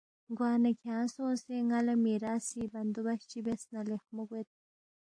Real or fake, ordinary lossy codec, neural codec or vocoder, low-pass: real; AAC, 48 kbps; none; 9.9 kHz